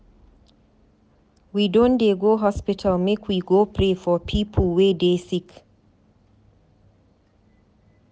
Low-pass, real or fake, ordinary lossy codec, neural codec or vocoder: none; real; none; none